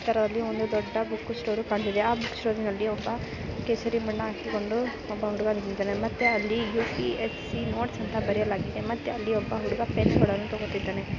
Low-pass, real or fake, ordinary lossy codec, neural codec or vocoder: 7.2 kHz; real; none; none